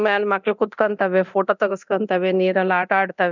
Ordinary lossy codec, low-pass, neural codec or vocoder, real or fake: none; 7.2 kHz; codec, 24 kHz, 0.9 kbps, DualCodec; fake